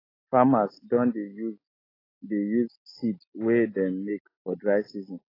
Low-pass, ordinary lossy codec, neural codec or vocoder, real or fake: 5.4 kHz; AAC, 24 kbps; none; real